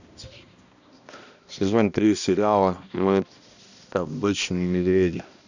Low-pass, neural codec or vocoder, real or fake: 7.2 kHz; codec, 16 kHz, 1 kbps, X-Codec, HuBERT features, trained on balanced general audio; fake